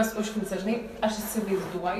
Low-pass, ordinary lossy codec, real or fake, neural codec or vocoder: 14.4 kHz; AAC, 96 kbps; fake; vocoder, 44.1 kHz, 128 mel bands every 512 samples, BigVGAN v2